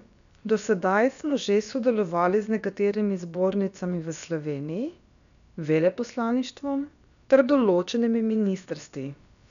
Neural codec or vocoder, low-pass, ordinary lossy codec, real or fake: codec, 16 kHz, about 1 kbps, DyCAST, with the encoder's durations; 7.2 kHz; none; fake